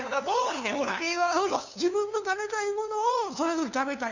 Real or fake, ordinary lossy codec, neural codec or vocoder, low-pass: fake; none; codec, 16 kHz, 2 kbps, FunCodec, trained on LibriTTS, 25 frames a second; 7.2 kHz